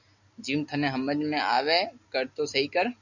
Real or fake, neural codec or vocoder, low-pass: real; none; 7.2 kHz